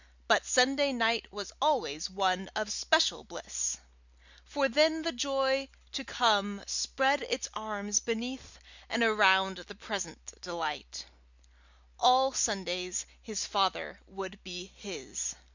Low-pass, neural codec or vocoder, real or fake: 7.2 kHz; none; real